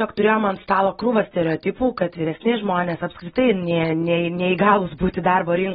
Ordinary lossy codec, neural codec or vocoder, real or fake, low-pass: AAC, 16 kbps; none; real; 19.8 kHz